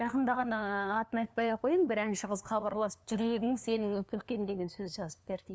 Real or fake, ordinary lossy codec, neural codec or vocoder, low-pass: fake; none; codec, 16 kHz, 2 kbps, FunCodec, trained on LibriTTS, 25 frames a second; none